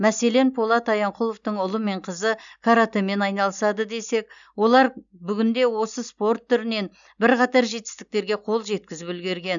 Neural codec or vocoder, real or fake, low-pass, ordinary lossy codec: none; real; 7.2 kHz; none